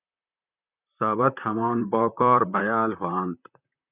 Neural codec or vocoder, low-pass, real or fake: vocoder, 44.1 kHz, 128 mel bands, Pupu-Vocoder; 3.6 kHz; fake